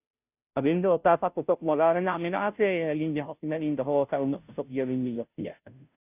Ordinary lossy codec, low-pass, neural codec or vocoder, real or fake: none; 3.6 kHz; codec, 16 kHz, 0.5 kbps, FunCodec, trained on Chinese and English, 25 frames a second; fake